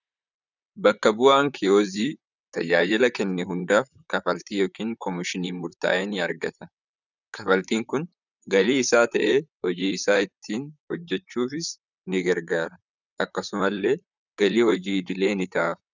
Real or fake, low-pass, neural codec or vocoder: fake; 7.2 kHz; vocoder, 44.1 kHz, 128 mel bands, Pupu-Vocoder